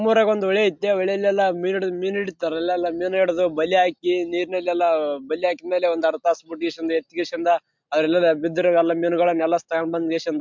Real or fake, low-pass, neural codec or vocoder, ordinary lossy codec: real; 7.2 kHz; none; MP3, 64 kbps